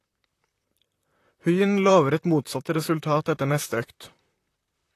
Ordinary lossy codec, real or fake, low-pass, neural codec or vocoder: AAC, 48 kbps; fake; 14.4 kHz; vocoder, 44.1 kHz, 128 mel bands, Pupu-Vocoder